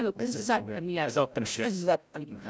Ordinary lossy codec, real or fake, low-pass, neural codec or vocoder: none; fake; none; codec, 16 kHz, 0.5 kbps, FreqCodec, larger model